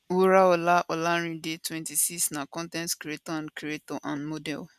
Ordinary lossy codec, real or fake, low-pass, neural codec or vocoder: none; real; 14.4 kHz; none